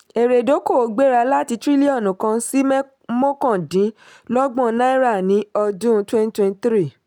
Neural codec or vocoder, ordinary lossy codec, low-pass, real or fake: none; none; 19.8 kHz; real